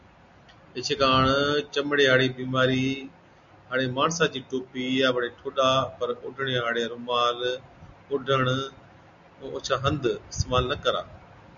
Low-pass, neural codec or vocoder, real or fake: 7.2 kHz; none; real